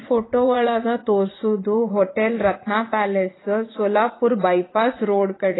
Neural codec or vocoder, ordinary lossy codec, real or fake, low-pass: vocoder, 44.1 kHz, 80 mel bands, Vocos; AAC, 16 kbps; fake; 7.2 kHz